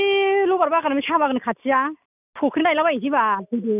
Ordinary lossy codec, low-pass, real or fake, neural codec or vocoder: none; 3.6 kHz; real; none